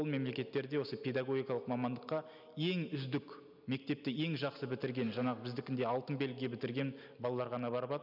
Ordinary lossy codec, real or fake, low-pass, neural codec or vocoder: none; real; 5.4 kHz; none